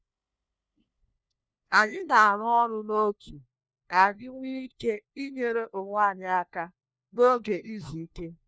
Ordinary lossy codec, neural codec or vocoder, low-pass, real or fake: none; codec, 16 kHz, 1 kbps, FunCodec, trained on LibriTTS, 50 frames a second; none; fake